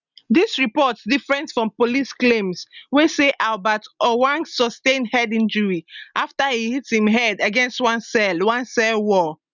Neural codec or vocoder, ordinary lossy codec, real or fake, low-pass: none; none; real; 7.2 kHz